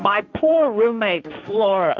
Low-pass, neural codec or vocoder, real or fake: 7.2 kHz; codec, 16 kHz, 1.1 kbps, Voila-Tokenizer; fake